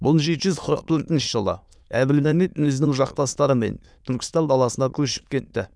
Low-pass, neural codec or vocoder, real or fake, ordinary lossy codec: none; autoencoder, 22.05 kHz, a latent of 192 numbers a frame, VITS, trained on many speakers; fake; none